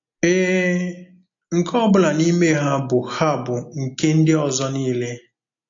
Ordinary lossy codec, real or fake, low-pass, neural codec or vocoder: AAC, 48 kbps; real; 7.2 kHz; none